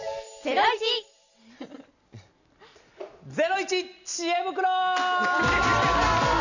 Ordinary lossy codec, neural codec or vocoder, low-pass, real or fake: none; none; 7.2 kHz; real